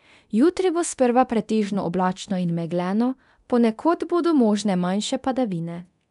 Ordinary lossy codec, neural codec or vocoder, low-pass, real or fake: none; codec, 24 kHz, 0.9 kbps, DualCodec; 10.8 kHz; fake